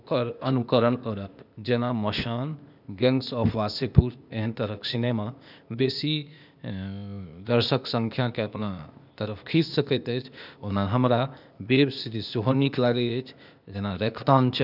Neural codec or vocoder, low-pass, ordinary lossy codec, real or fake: codec, 16 kHz, 0.8 kbps, ZipCodec; 5.4 kHz; none; fake